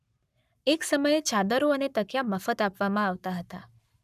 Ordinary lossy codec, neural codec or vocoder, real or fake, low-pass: none; codec, 44.1 kHz, 7.8 kbps, Pupu-Codec; fake; 14.4 kHz